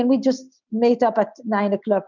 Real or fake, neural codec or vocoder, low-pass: real; none; 7.2 kHz